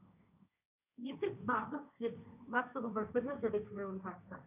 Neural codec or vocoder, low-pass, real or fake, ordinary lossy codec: codec, 16 kHz, 1.1 kbps, Voila-Tokenizer; 3.6 kHz; fake; none